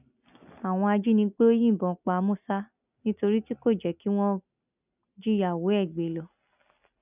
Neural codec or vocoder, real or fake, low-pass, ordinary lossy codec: none; real; 3.6 kHz; none